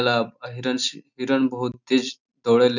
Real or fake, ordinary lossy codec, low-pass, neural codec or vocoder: real; none; 7.2 kHz; none